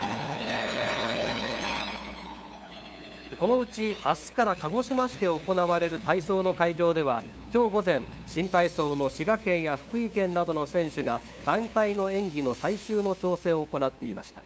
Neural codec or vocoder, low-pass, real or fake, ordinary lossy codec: codec, 16 kHz, 2 kbps, FunCodec, trained on LibriTTS, 25 frames a second; none; fake; none